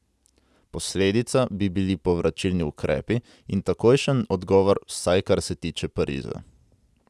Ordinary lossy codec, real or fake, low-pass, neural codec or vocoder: none; real; none; none